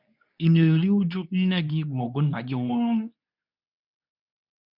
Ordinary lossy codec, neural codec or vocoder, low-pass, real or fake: none; codec, 24 kHz, 0.9 kbps, WavTokenizer, medium speech release version 2; 5.4 kHz; fake